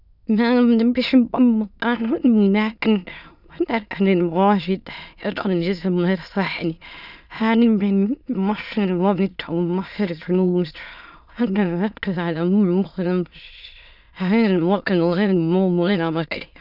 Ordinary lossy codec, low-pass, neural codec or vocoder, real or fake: none; 5.4 kHz; autoencoder, 22.05 kHz, a latent of 192 numbers a frame, VITS, trained on many speakers; fake